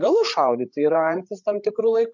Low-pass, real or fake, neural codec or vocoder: 7.2 kHz; fake; codec, 16 kHz, 8 kbps, FreqCodec, larger model